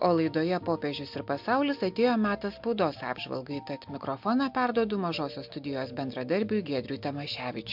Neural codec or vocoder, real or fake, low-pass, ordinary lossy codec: none; real; 5.4 kHz; MP3, 48 kbps